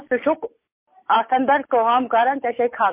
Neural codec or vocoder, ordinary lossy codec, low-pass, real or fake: none; MP3, 24 kbps; 3.6 kHz; real